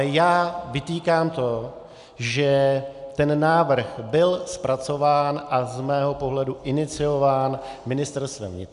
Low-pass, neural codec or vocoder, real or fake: 10.8 kHz; none; real